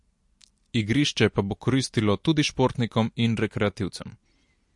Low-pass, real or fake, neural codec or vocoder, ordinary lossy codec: 10.8 kHz; real; none; MP3, 48 kbps